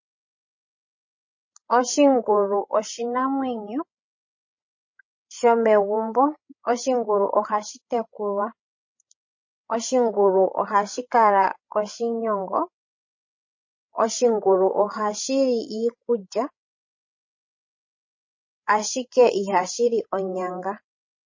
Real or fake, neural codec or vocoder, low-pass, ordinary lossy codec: fake; codec, 16 kHz, 16 kbps, FreqCodec, larger model; 7.2 kHz; MP3, 32 kbps